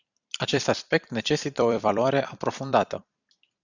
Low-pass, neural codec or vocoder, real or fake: 7.2 kHz; vocoder, 44.1 kHz, 128 mel bands every 256 samples, BigVGAN v2; fake